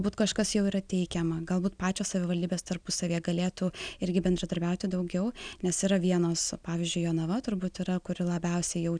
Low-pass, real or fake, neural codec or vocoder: 9.9 kHz; real; none